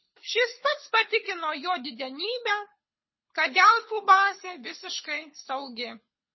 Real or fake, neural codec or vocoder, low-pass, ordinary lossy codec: fake; codec, 24 kHz, 6 kbps, HILCodec; 7.2 kHz; MP3, 24 kbps